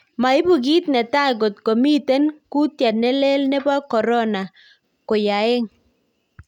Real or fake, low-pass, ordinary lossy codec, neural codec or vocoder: real; 19.8 kHz; none; none